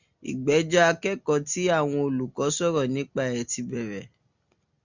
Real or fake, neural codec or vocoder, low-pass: real; none; 7.2 kHz